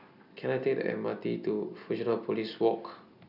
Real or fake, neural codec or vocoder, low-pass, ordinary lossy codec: real; none; 5.4 kHz; none